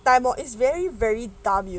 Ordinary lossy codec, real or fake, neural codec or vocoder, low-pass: none; real; none; none